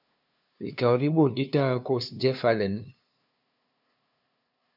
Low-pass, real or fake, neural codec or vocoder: 5.4 kHz; fake; codec, 16 kHz, 2 kbps, FunCodec, trained on LibriTTS, 25 frames a second